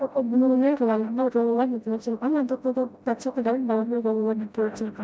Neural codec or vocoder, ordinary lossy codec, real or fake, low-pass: codec, 16 kHz, 0.5 kbps, FreqCodec, smaller model; none; fake; none